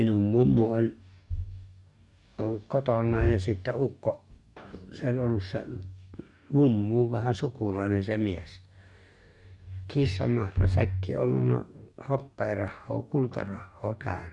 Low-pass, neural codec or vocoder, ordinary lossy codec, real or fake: 10.8 kHz; codec, 44.1 kHz, 2.6 kbps, DAC; none; fake